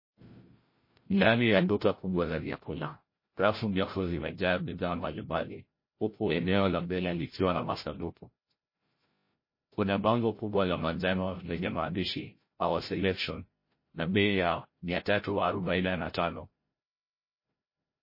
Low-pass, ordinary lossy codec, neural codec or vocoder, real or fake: 5.4 kHz; MP3, 24 kbps; codec, 16 kHz, 0.5 kbps, FreqCodec, larger model; fake